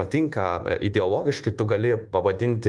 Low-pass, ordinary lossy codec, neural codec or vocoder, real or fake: 10.8 kHz; Opus, 24 kbps; codec, 24 kHz, 1.2 kbps, DualCodec; fake